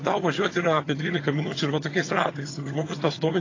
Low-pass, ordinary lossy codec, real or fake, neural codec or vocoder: 7.2 kHz; AAC, 32 kbps; fake; vocoder, 22.05 kHz, 80 mel bands, HiFi-GAN